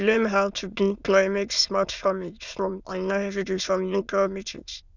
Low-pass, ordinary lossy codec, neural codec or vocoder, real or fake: 7.2 kHz; none; autoencoder, 22.05 kHz, a latent of 192 numbers a frame, VITS, trained on many speakers; fake